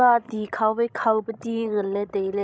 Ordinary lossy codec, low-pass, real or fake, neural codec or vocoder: none; none; fake; codec, 16 kHz, 16 kbps, FreqCodec, larger model